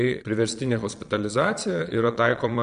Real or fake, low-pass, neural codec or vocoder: fake; 9.9 kHz; vocoder, 22.05 kHz, 80 mel bands, Vocos